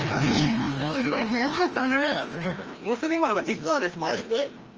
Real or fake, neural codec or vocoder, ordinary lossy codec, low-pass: fake; codec, 16 kHz, 1 kbps, FreqCodec, larger model; Opus, 24 kbps; 7.2 kHz